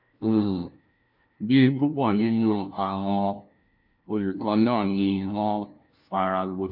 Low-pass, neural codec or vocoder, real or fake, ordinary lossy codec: 5.4 kHz; codec, 16 kHz, 1 kbps, FunCodec, trained on LibriTTS, 50 frames a second; fake; MP3, 48 kbps